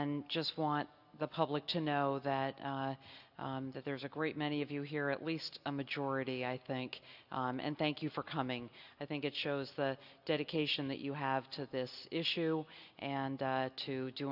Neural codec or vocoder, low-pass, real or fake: none; 5.4 kHz; real